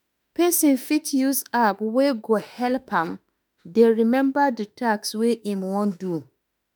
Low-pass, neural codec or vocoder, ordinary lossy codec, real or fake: none; autoencoder, 48 kHz, 32 numbers a frame, DAC-VAE, trained on Japanese speech; none; fake